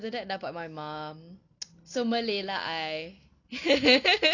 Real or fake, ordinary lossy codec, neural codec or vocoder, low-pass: real; none; none; 7.2 kHz